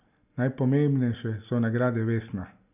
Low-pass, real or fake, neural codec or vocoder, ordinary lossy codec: 3.6 kHz; real; none; none